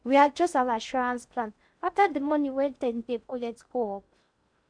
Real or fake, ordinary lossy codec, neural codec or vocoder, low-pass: fake; none; codec, 16 kHz in and 24 kHz out, 0.6 kbps, FocalCodec, streaming, 2048 codes; 9.9 kHz